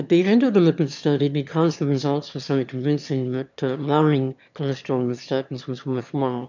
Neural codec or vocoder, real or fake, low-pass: autoencoder, 22.05 kHz, a latent of 192 numbers a frame, VITS, trained on one speaker; fake; 7.2 kHz